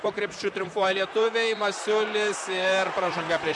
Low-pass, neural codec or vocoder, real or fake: 10.8 kHz; vocoder, 44.1 kHz, 128 mel bands, Pupu-Vocoder; fake